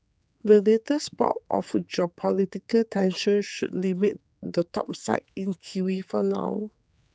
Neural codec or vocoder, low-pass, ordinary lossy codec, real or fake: codec, 16 kHz, 4 kbps, X-Codec, HuBERT features, trained on general audio; none; none; fake